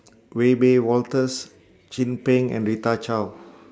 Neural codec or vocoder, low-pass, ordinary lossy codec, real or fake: none; none; none; real